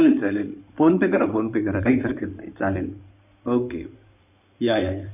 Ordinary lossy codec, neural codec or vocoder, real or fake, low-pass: none; codec, 16 kHz in and 24 kHz out, 2.2 kbps, FireRedTTS-2 codec; fake; 3.6 kHz